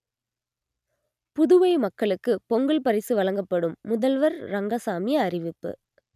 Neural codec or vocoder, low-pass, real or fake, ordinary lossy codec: none; 14.4 kHz; real; none